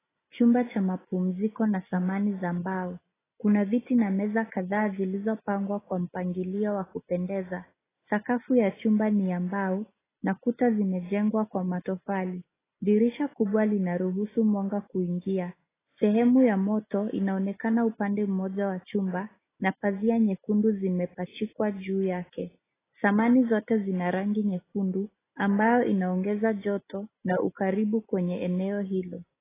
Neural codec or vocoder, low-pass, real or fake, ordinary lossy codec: none; 3.6 kHz; real; AAC, 16 kbps